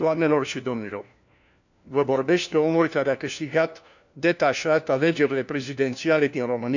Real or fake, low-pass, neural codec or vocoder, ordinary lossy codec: fake; 7.2 kHz; codec, 16 kHz, 1 kbps, FunCodec, trained on LibriTTS, 50 frames a second; none